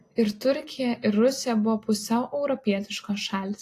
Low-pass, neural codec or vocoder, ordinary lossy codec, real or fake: 14.4 kHz; none; AAC, 48 kbps; real